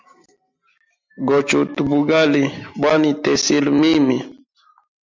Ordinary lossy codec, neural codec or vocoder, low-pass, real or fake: MP3, 64 kbps; none; 7.2 kHz; real